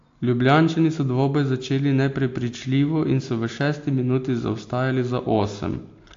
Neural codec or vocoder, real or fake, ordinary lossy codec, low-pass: none; real; AAC, 48 kbps; 7.2 kHz